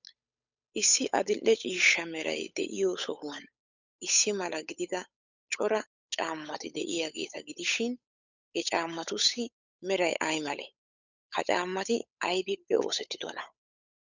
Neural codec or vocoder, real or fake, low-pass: codec, 16 kHz, 8 kbps, FunCodec, trained on Chinese and English, 25 frames a second; fake; 7.2 kHz